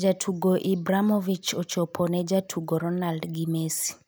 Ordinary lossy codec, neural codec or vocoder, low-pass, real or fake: none; none; none; real